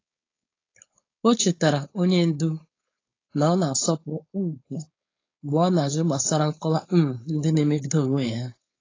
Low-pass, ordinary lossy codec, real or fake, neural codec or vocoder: 7.2 kHz; AAC, 32 kbps; fake; codec, 16 kHz, 4.8 kbps, FACodec